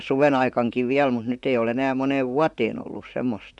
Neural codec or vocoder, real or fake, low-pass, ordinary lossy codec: vocoder, 44.1 kHz, 128 mel bands, Pupu-Vocoder; fake; 10.8 kHz; none